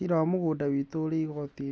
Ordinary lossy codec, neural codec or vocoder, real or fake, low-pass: none; none; real; none